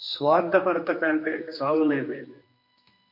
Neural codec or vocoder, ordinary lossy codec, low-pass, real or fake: codec, 16 kHz, 2 kbps, X-Codec, HuBERT features, trained on general audio; MP3, 32 kbps; 5.4 kHz; fake